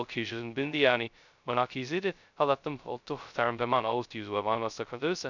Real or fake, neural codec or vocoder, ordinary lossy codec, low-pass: fake; codec, 16 kHz, 0.2 kbps, FocalCodec; none; 7.2 kHz